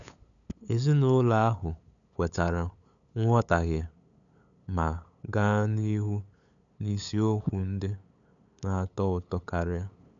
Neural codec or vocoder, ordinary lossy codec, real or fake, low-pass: codec, 16 kHz, 8 kbps, FunCodec, trained on LibriTTS, 25 frames a second; none; fake; 7.2 kHz